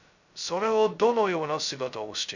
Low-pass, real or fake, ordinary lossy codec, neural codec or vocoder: 7.2 kHz; fake; none; codec, 16 kHz, 0.2 kbps, FocalCodec